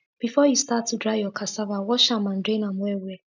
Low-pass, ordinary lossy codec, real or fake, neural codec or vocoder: 7.2 kHz; none; real; none